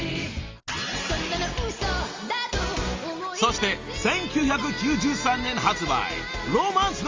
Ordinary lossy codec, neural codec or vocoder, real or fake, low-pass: Opus, 32 kbps; none; real; 7.2 kHz